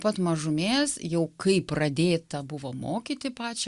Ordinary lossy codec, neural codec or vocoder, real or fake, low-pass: Opus, 64 kbps; none; real; 10.8 kHz